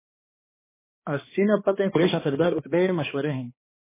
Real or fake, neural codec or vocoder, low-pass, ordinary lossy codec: fake; codec, 16 kHz, 2 kbps, X-Codec, HuBERT features, trained on balanced general audio; 3.6 kHz; MP3, 16 kbps